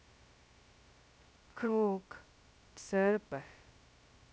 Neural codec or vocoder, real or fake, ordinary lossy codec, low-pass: codec, 16 kHz, 0.2 kbps, FocalCodec; fake; none; none